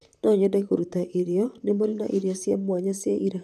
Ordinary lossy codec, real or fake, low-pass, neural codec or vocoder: none; fake; 14.4 kHz; vocoder, 44.1 kHz, 128 mel bands, Pupu-Vocoder